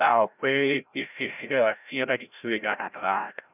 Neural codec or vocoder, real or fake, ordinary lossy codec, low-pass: codec, 16 kHz, 0.5 kbps, FreqCodec, larger model; fake; none; 3.6 kHz